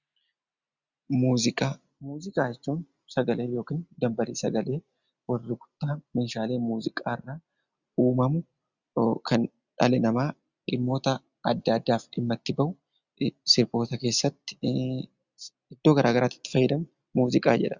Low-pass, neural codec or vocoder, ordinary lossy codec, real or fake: 7.2 kHz; none; Opus, 64 kbps; real